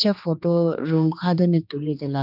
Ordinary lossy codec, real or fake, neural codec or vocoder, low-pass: none; fake; codec, 16 kHz, 2 kbps, X-Codec, HuBERT features, trained on general audio; 5.4 kHz